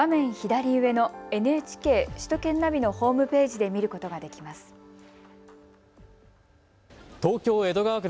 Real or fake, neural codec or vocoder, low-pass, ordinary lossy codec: real; none; none; none